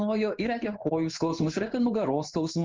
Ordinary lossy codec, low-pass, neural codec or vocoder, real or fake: Opus, 32 kbps; 7.2 kHz; codec, 16 kHz in and 24 kHz out, 1 kbps, XY-Tokenizer; fake